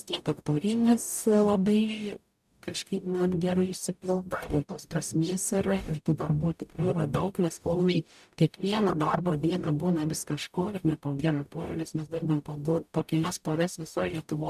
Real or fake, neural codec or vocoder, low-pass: fake; codec, 44.1 kHz, 0.9 kbps, DAC; 14.4 kHz